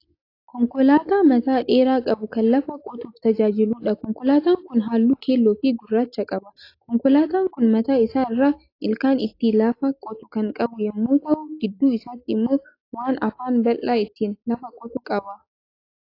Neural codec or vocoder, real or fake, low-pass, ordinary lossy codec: none; real; 5.4 kHz; AAC, 32 kbps